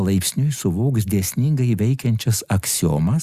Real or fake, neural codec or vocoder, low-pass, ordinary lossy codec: real; none; 14.4 kHz; Opus, 64 kbps